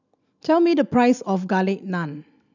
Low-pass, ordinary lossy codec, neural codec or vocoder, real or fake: 7.2 kHz; none; none; real